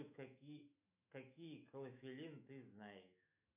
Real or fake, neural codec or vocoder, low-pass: fake; autoencoder, 48 kHz, 128 numbers a frame, DAC-VAE, trained on Japanese speech; 3.6 kHz